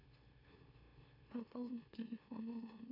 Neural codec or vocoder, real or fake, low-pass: autoencoder, 44.1 kHz, a latent of 192 numbers a frame, MeloTTS; fake; 5.4 kHz